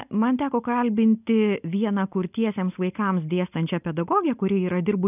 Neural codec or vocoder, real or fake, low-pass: none; real; 3.6 kHz